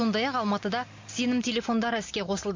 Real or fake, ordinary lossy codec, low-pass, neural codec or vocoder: real; MP3, 48 kbps; 7.2 kHz; none